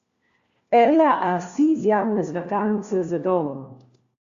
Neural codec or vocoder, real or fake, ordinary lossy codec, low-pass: codec, 16 kHz, 1 kbps, FunCodec, trained on LibriTTS, 50 frames a second; fake; Opus, 64 kbps; 7.2 kHz